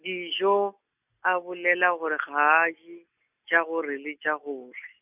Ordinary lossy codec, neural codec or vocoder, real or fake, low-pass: none; none; real; 3.6 kHz